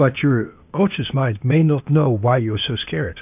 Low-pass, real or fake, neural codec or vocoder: 3.6 kHz; fake; codec, 16 kHz, 0.8 kbps, ZipCodec